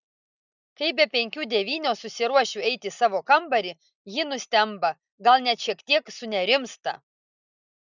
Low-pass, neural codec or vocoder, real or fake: 7.2 kHz; none; real